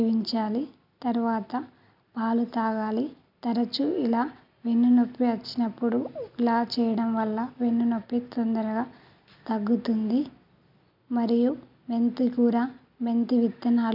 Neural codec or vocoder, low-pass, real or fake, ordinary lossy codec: none; 5.4 kHz; real; none